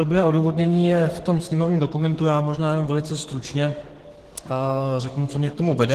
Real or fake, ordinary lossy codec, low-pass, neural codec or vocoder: fake; Opus, 16 kbps; 14.4 kHz; codec, 32 kHz, 1.9 kbps, SNAC